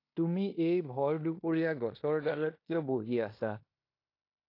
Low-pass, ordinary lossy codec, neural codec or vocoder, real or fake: 5.4 kHz; AAC, 32 kbps; codec, 16 kHz in and 24 kHz out, 0.9 kbps, LongCat-Audio-Codec, fine tuned four codebook decoder; fake